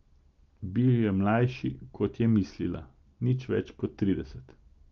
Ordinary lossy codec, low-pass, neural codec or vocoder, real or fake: Opus, 32 kbps; 7.2 kHz; none; real